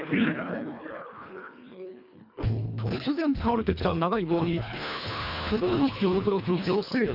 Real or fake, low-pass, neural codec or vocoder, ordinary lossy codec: fake; 5.4 kHz; codec, 24 kHz, 1.5 kbps, HILCodec; none